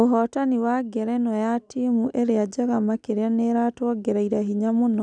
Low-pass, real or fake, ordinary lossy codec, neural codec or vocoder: 9.9 kHz; real; none; none